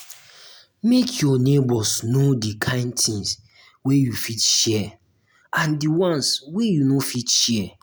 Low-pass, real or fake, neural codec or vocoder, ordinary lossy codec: none; real; none; none